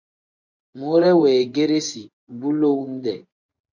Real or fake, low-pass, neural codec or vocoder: fake; 7.2 kHz; vocoder, 24 kHz, 100 mel bands, Vocos